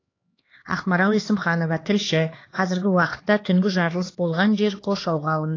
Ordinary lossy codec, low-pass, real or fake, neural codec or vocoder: AAC, 32 kbps; 7.2 kHz; fake; codec, 16 kHz, 2 kbps, X-Codec, HuBERT features, trained on LibriSpeech